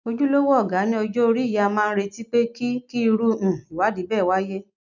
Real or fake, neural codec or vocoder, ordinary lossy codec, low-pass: real; none; none; 7.2 kHz